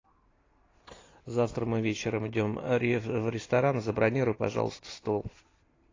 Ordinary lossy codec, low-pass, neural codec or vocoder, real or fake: AAC, 32 kbps; 7.2 kHz; none; real